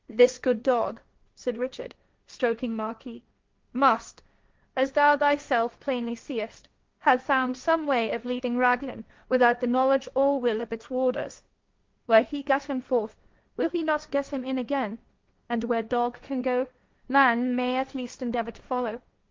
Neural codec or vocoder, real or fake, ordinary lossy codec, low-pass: codec, 16 kHz, 1.1 kbps, Voila-Tokenizer; fake; Opus, 24 kbps; 7.2 kHz